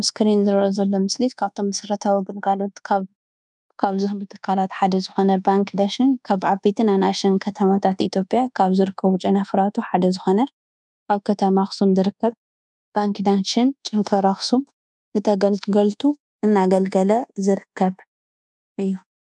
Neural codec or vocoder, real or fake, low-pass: codec, 24 kHz, 1.2 kbps, DualCodec; fake; 10.8 kHz